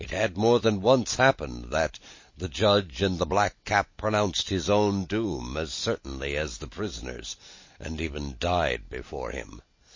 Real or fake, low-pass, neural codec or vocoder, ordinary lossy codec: real; 7.2 kHz; none; MP3, 32 kbps